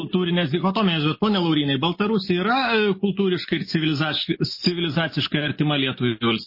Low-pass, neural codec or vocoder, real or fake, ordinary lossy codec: 5.4 kHz; none; real; MP3, 24 kbps